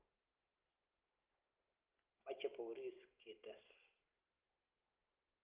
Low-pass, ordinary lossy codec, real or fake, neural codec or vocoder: 3.6 kHz; Opus, 24 kbps; real; none